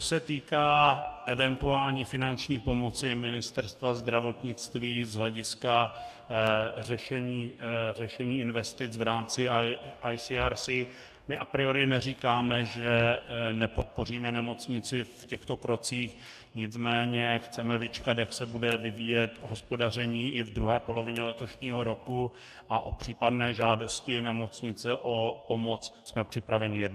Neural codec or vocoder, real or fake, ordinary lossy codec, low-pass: codec, 44.1 kHz, 2.6 kbps, DAC; fake; AAC, 96 kbps; 14.4 kHz